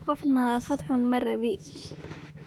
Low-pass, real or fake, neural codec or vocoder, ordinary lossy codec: 19.8 kHz; fake; autoencoder, 48 kHz, 32 numbers a frame, DAC-VAE, trained on Japanese speech; none